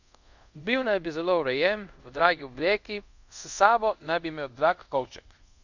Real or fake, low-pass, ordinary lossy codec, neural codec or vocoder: fake; 7.2 kHz; none; codec, 24 kHz, 0.5 kbps, DualCodec